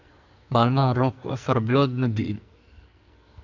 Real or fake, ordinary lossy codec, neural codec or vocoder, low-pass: fake; none; codec, 44.1 kHz, 2.6 kbps, SNAC; 7.2 kHz